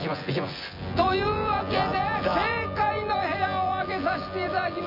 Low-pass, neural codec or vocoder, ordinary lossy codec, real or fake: 5.4 kHz; vocoder, 24 kHz, 100 mel bands, Vocos; none; fake